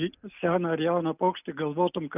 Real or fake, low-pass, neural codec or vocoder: real; 3.6 kHz; none